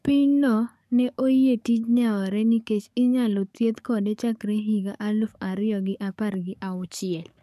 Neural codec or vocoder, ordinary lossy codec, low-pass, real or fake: codec, 44.1 kHz, 7.8 kbps, DAC; none; 14.4 kHz; fake